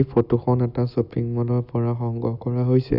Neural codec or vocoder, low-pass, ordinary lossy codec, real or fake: none; 5.4 kHz; none; real